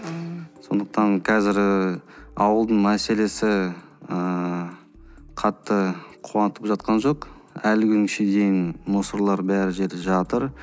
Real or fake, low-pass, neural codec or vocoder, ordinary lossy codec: real; none; none; none